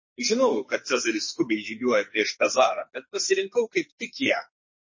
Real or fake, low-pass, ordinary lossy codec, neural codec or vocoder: fake; 7.2 kHz; MP3, 32 kbps; codec, 44.1 kHz, 2.6 kbps, SNAC